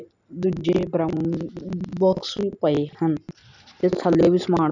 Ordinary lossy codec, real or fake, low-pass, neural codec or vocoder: none; real; 7.2 kHz; none